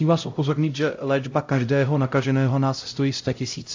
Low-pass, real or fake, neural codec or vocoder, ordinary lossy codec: 7.2 kHz; fake; codec, 16 kHz, 0.5 kbps, X-Codec, HuBERT features, trained on LibriSpeech; AAC, 48 kbps